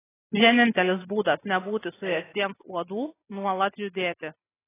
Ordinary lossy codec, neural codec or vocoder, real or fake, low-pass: AAC, 16 kbps; none; real; 3.6 kHz